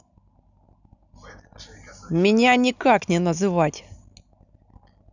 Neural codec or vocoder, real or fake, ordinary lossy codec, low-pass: none; real; none; 7.2 kHz